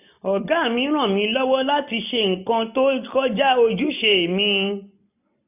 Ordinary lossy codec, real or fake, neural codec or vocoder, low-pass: none; real; none; 3.6 kHz